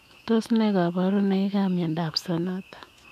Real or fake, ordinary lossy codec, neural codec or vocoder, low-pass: fake; none; autoencoder, 48 kHz, 128 numbers a frame, DAC-VAE, trained on Japanese speech; 14.4 kHz